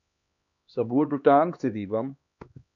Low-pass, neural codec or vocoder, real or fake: 7.2 kHz; codec, 16 kHz, 2 kbps, X-Codec, HuBERT features, trained on LibriSpeech; fake